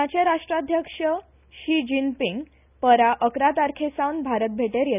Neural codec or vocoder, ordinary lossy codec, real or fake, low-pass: none; none; real; 3.6 kHz